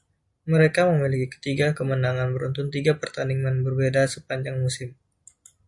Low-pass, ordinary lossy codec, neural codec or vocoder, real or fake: 10.8 kHz; Opus, 64 kbps; none; real